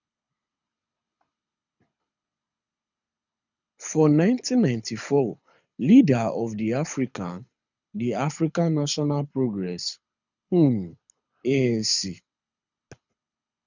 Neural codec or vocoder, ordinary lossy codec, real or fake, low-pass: codec, 24 kHz, 6 kbps, HILCodec; none; fake; 7.2 kHz